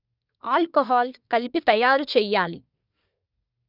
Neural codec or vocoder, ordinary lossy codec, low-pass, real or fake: codec, 24 kHz, 1 kbps, SNAC; none; 5.4 kHz; fake